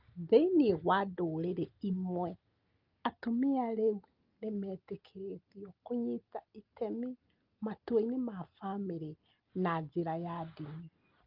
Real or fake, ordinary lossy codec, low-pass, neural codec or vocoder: real; Opus, 24 kbps; 5.4 kHz; none